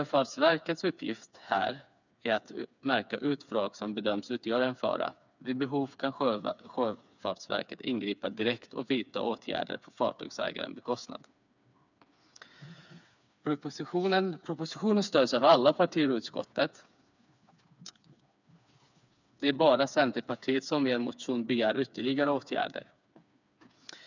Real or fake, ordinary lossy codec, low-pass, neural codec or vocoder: fake; none; 7.2 kHz; codec, 16 kHz, 4 kbps, FreqCodec, smaller model